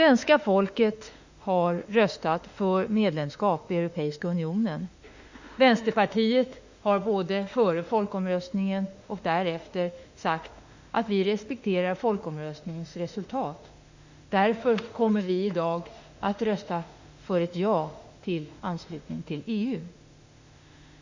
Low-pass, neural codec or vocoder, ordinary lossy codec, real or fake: 7.2 kHz; autoencoder, 48 kHz, 32 numbers a frame, DAC-VAE, trained on Japanese speech; Opus, 64 kbps; fake